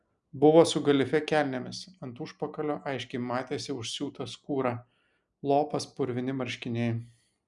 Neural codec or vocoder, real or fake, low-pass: none; real; 10.8 kHz